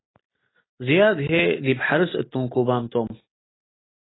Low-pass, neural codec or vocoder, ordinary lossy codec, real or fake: 7.2 kHz; none; AAC, 16 kbps; real